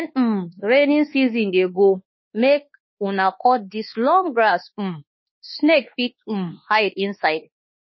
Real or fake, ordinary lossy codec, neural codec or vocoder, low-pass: fake; MP3, 24 kbps; codec, 24 kHz, 1.2 kbps, DualCodec; 7.2 kHz